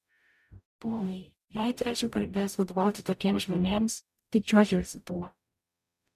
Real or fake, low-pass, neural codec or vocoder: fake; 14.4 kHz; codec, 44.1 kHz, 0.9 kbps, DAC